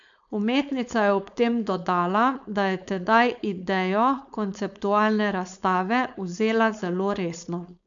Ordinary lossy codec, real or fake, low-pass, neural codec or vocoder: none; fake; 7.2 kHz; codec, 16 kHz, 4.8 kbps, FACodec